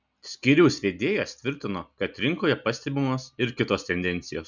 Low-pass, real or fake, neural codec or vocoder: 7.2 kHz; real; none